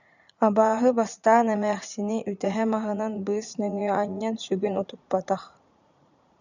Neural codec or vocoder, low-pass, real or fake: vocoder, 44.1 kHz, 80 mel bands, Vocos; 7.2 kHz; fake